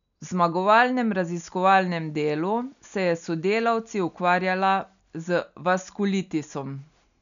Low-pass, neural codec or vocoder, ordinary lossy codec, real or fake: 7.2 kHz; none; none; real